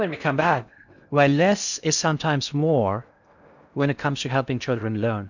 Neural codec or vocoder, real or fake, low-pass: codec, 16 kHz in and 24 kHz out, 0.6 kbps, FocalCodec, streaming, 4096 codes; fake; 7.2 kHz